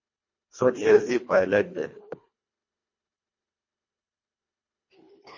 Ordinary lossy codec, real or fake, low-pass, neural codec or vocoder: MP3, 32 kbps; fake; 7.2 kHz; codec, 24 kHz, 1.5 kbps, HILCodec